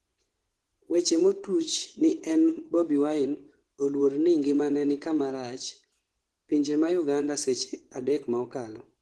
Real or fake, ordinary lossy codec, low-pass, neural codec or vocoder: fake; Opus, 16 kbps; 10.8 kHz; vocoder, 24 kHz, 100 mel bands, Vocos